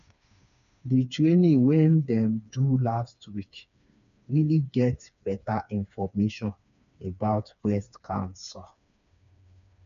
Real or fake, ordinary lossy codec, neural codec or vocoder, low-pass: fake; none; codec, 16 kHz, 4 kbps, FreqCodec, smaller model; 7.2 kHz